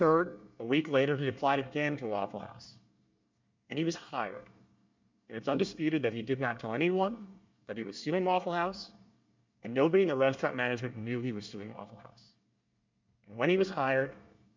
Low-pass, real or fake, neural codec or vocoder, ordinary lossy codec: 7.2 kHz; fake; codec, 24 kHz, 1 kbps, SNAC; MP3, 64 kbps